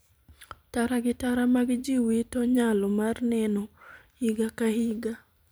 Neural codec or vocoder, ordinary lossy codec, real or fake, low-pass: vocoder, 44.1 kHz, 128 mel bands every 512 samples, BigVGAN v2; none; fake; none